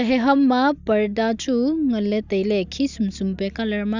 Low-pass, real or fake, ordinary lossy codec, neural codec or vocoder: 7.2 kHz; real; none; none